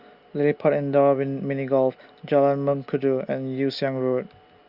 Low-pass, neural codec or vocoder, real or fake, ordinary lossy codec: 5.4 kHz; none; real; Opus, 64 kbps